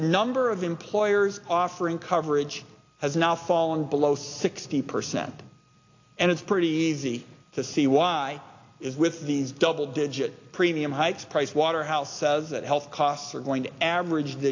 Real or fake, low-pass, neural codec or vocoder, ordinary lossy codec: real; 7.2 kHz; none; AAC, 48 kbps